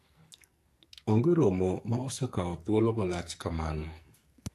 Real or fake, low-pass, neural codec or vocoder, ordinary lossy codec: fake; 14.4 kHz; codec, 44.1 kHz, 2.6 kbps, SNAC; AAC, 64 kbps